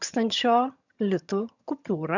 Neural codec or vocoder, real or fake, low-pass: vocoder, 22.05 kHz, 80 mel bands, HiFi-GAN; fake; 7.2 kHz